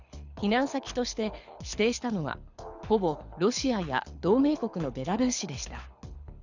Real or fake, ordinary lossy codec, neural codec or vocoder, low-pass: fake; none; codec, 24 kHz, 6 kbps, HILCodec; 7.2 kHz